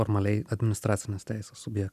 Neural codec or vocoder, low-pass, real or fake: none; 14.4 kHz; real